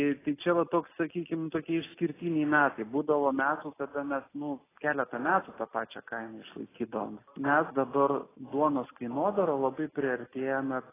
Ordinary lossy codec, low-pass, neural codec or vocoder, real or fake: AAC, 16 kbps; 3.6 kHz; none; real